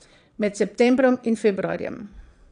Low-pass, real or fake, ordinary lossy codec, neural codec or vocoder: 9.9 kHz; fake; none; vocoder, 22.05 kHz, 80 mel bands, Vocos